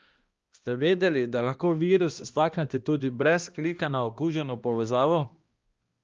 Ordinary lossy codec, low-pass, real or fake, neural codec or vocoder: Opus, 32 kbps; 7.2 kHz; fake; codec, 16 kHz, 1 kbps, X-Codec, HuBERT features, trained on balanced general audio